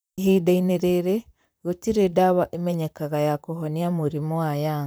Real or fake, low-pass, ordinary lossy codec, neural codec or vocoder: fake; none; none; vocoder, 44.1 kHz, 128 mel bands, Pupu-Vocoder